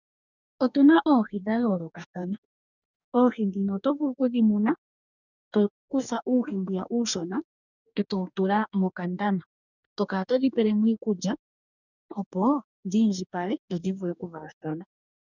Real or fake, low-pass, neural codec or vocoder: fake; 7.2 kHz; codec, 44.1 kHz, 2.6 kbps, DAC